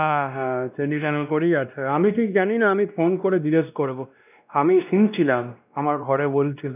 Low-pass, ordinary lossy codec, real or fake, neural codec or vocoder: 3.6 kHz; none; fake; codec, 16 kHz, 1 kbps, X-Codec, WavLM features, trained on Multilingual LibriSpeech